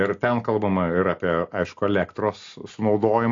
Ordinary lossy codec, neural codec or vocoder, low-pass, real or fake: AAC, 48 kbps; none; 7.2 kHz; real